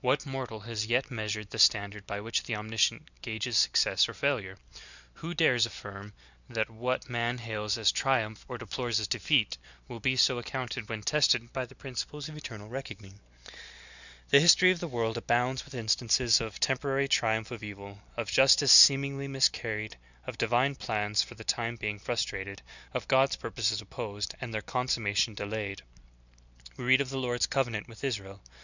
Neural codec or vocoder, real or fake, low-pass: none; real; 7.2 kHz